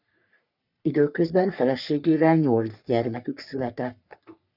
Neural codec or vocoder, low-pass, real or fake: codec, 44.1 kHz, 3.4 kbps, Pupu-Codec; 5.4 kHz; fake